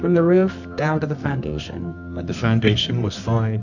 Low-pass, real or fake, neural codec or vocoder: 7.2 kHz; fake; codec, 24 kHz, 0.9 kbps, WavTokenizer, medium music audio release